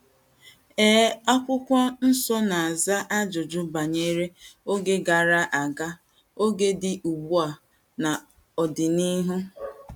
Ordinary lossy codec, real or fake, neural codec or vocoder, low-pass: none; real; none; 19.8 kHz